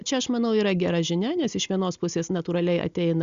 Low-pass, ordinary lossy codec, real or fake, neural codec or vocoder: 7.2 kHz; Opus, 64 kbps; real; none